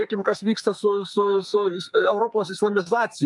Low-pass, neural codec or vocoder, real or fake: 10.8 kHz; codec, 32 kHz, 1.9 kbps, SNAC; fake